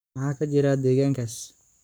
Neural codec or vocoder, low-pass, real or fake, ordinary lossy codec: codec, 44.1 kHz, 7.8 kbps, Pupu-Codec; none; fake; none